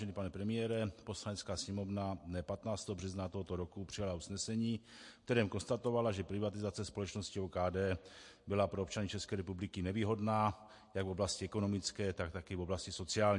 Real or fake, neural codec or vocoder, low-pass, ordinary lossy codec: real; none; 10.8 kHz; MP3, 48 kbps